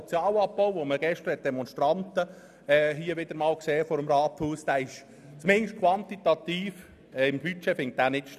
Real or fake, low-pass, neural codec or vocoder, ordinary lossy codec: real; 14.4 kHz; none; none